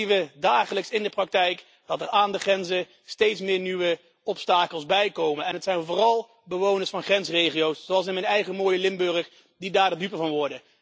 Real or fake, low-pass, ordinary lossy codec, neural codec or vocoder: real; none; none; none